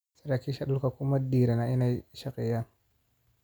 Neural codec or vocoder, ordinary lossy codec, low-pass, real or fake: none; none; none; real